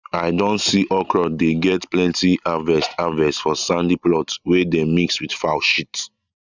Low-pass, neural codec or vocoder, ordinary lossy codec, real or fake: 7.2 kHz; none; none; real